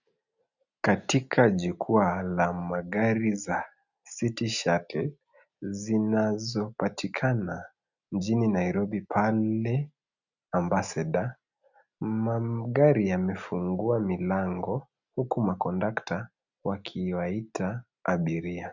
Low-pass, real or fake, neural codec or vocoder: 7.2 kHz; real; none